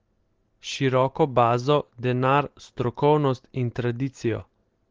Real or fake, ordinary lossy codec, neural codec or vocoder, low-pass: real; Opus, 16 kbps; none; 7.2 kHz